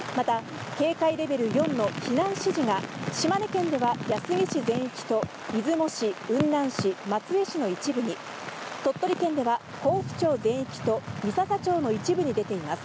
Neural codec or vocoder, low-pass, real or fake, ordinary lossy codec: none; none; real; none